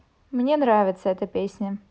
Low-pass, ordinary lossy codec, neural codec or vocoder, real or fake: none; none; none; real